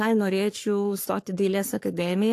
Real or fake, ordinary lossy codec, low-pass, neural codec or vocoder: fake; AAC, 64 kbps; 14.4 kHz; codec, 44.1 kHz, 3.4 kbps, Pupu-Codec